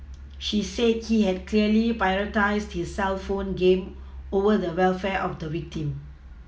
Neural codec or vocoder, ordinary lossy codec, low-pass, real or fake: none; none; none; real